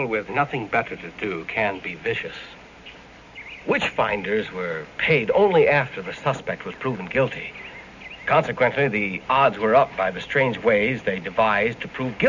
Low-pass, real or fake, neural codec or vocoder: 7.2 kHz; real; none